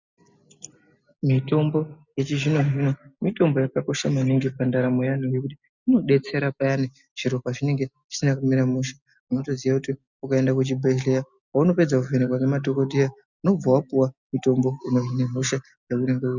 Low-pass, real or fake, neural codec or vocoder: 7.2 kHz; real; none